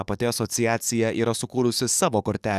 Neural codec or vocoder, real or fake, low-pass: codec, 44.1 kHz, 7.8 kbps, DAC; fake; 14.4 kHz